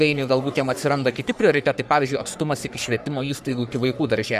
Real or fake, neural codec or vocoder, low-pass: fake; codec, 44.1 kHz, 3.4 kbps, Pupu-Codec; 14.4 kHz